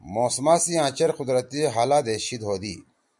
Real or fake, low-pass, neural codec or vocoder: real; 10.8 kHz; none